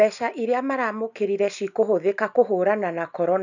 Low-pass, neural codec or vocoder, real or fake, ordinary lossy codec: 7.2 kHz; none; real; none